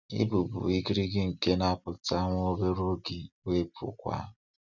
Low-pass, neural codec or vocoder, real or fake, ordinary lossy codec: 7.2 kHz; none; real; none